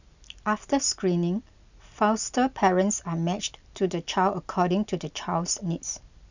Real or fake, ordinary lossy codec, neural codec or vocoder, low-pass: real; none; none; 7.2 kHz